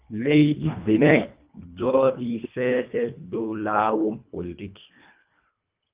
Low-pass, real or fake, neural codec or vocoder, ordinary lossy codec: 3.6 kHz; fake; codec, 24 kHz, 1.5 kbps, HILCodec; Opus, 24 kbps